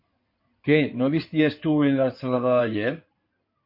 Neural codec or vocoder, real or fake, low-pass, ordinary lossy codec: codec, 16 kHz in and 24 kHz out, 2.2 kbps, FireRedTTS-2 codec; fake; 5.4 kHz; MP3, 32 kbps